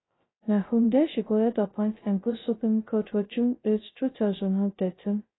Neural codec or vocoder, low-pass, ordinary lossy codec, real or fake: codec, 16 kHz, 0.2 kbps, FocalCodec; 7.2 kHz; AAC, 16 kbps; fake